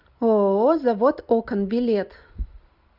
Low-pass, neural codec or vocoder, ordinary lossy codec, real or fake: 5.4 kHz; none; Opus, 64 kbps; real